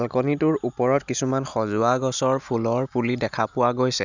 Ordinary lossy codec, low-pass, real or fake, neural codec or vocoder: none; 7.2 kHz; real; none